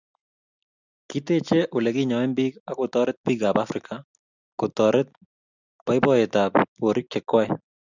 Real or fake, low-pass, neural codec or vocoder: real; 7.2 kHz; none